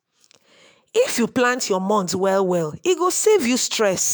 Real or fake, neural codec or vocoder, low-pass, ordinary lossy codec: fake; autoencoder, 48 kHz, 128 numbers a frame, DAC-VAE, trained on Japanese speech; none; none